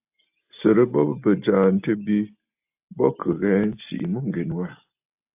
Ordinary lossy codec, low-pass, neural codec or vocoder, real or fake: AAC, 32 kbps; 3.6 kHz; none; real